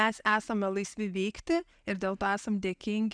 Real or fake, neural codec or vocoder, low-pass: real; none; 9.9 kHz